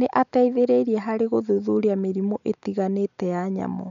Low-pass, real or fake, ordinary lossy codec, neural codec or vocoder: 7.2 kHz; real; none; none